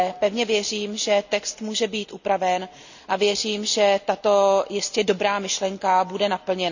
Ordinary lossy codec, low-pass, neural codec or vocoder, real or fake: none; 7.2 kHz; none; real